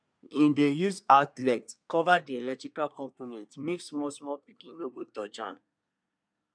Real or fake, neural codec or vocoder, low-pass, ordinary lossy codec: fake; codec, 24 kHz, 1 kbps, SNAC; 9.9 kHz; none